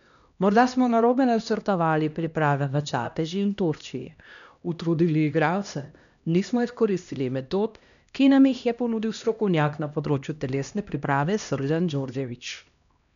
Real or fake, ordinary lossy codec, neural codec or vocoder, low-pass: fake; none; codec, 16 kHz, 1 kbps, X-Codec, HuBERT features, trained on LibriSpeech; 7.2 kHz